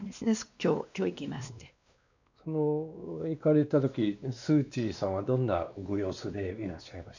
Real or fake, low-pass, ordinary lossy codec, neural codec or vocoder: fake; 7.2 kHz; none; codec, 16 kHz, 2 kbps, X-Codec, WavLM features, trained on Multilingual LibriSpeech